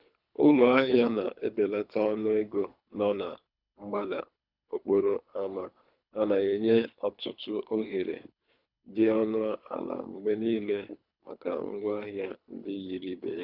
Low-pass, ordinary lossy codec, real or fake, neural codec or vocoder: 5.4 kHz; none; fake; codec, 24 kHz, 3 kbps, HILCodec